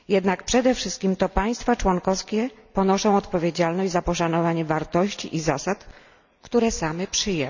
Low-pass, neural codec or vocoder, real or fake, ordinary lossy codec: 7.2 kHz; none; real; none